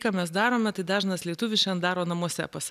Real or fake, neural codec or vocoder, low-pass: real; none; 14.4 kHz